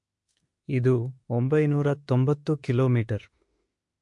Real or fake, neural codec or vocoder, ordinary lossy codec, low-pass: fake; autoencoder, 48 kHz, 32 numbers a frame, DAC-VAE, trained on Japanese speech; MP3, 48 kbps; 10.8 kHz